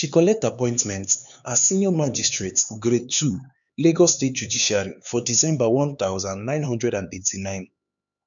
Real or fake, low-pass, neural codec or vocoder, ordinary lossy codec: fake; 7.2 kHz; codec, 16 kHz, 4 kbps, X-Codec, HuBERT features, trained on LibriSpeech; none